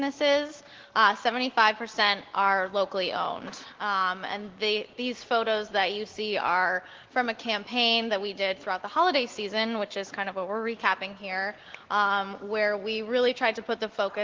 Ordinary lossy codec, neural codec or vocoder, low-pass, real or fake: Opus, 16 kbps; none; 7.2 kHz; real